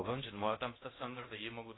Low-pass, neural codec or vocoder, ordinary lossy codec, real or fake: 7.2 kHz; codec, 16 kHz in and 24 kHz out, 0.6 kbps, FocalCodec, streaming, 4096 codes; AAC, 16 kbps; fake